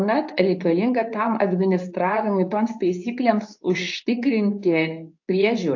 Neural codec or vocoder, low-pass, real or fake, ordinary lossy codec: codec, 24 kHz, 0.9 kbps, WavTokenizer, medium speech release version 2; 7.2 kHz; fake; AAC, 48 kbps